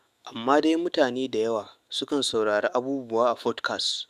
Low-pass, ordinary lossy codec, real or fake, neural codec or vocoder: 14.4 kHz; Opus, 64 kbps; fake; autoencoder, 48 kHz, 128 numbers a frame, DAC-VAE, trained on Japanese speech